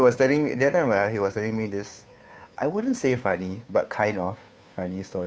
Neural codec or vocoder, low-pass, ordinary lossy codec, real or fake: codec, 16 kHz, 2 kbps, FunCodec, trained on Chinese and English, 25 frames a second; none; none; fake